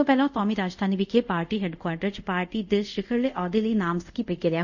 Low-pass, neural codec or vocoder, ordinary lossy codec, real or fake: 7.2 kHz; codec, 24 kHz, 0.5 kbps, DualCodec; none; fake